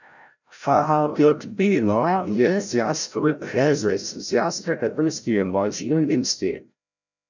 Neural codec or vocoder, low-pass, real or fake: codec, 16 kHz, 0.5 kbps, FreqCodec, larger model; 7.2 kHz; fake